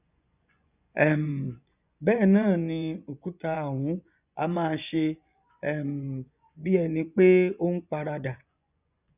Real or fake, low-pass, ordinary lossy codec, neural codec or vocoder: fake; 3.6 kHz; none; vocoder, 24 kHz, 100 mel bands, Vocos